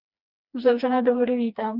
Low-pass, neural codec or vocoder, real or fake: 5.4 kHz; codec, 16 kHz, 2 kbps, FreqCodec, smaller model; fake